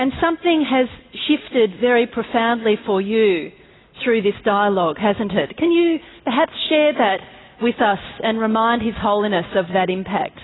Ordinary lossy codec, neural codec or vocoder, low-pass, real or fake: AAC, 16 kbps; none; 7.2 kHz; real